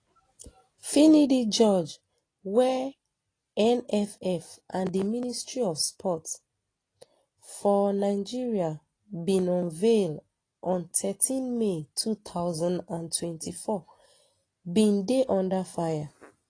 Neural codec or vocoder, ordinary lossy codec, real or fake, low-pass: none; AAC, 32 kbps; real; 9.9 kHz